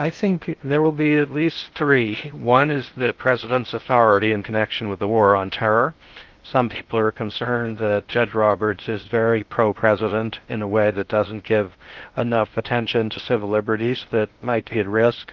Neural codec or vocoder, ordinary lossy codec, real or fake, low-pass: codec, 16 kHz in and 24 kHz out, 0.6 kbps, FocalCodec, streaming, 2048 codes; Opus, 32 kbps; fake; 7.2 kHz